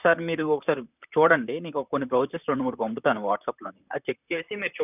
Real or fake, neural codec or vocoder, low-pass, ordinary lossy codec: fake; vocoder, 44.1 kHz, 128 mel bands, Pupu-Vocoder; 3.6 kHz; none